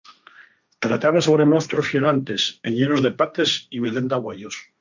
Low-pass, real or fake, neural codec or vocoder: 7.2 kHz; fake; codec, 16 kHz, 1.1 kbps, Voila-Tokenizer